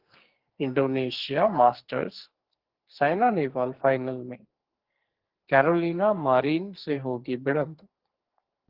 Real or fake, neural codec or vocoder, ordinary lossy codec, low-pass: fake; codec, 44.1 kHz, 2.6 kbps, SNAC; Opus, 16 kbps; 5.4 kHz